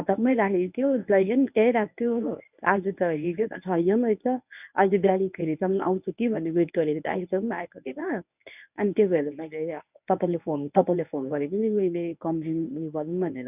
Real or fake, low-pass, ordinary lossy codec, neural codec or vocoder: fake; 3.6 kHz; none; codec, 24 kHz, 0.9 kbps, WavTokenizer, medium speech release version 1